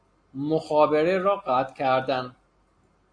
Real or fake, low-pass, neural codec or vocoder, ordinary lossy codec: real; 9.9 kHz; none; AAC, 48 kbps